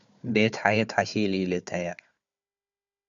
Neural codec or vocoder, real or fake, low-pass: codec, 16 kHz, 4 kbps, FunCodec, trained on Chinese and English, 50 frames a second; fake; 7.2 kHz